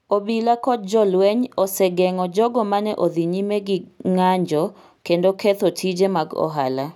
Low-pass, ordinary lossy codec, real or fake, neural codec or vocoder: 19.8 kHz; none; real; none